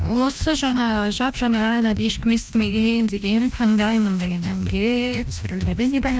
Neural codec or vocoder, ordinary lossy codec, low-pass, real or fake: codec, 16 kHz, 1 kbps, FreqCodec, larger model; none; none; fake